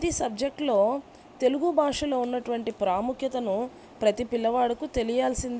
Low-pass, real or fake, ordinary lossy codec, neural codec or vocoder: none; real; none; none